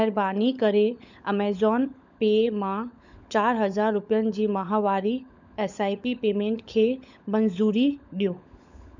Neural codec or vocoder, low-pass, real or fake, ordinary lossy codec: codec, 16 kHz, 16 kbps, FunCodec, trained on LibriTTS, 50 frames a second; 7.2 kHz; fake; none